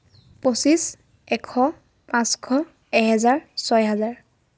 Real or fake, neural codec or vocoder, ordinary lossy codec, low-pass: real; none; none; none